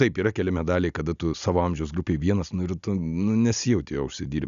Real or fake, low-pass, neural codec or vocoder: real; 7.2 kHz; none